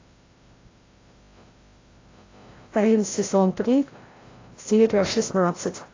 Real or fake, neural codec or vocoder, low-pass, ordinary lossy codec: fake; codec, 16 kHz, 0.5 kbps, FreqCodec, larger model; 7.2 kHz; AAC, 32 kbps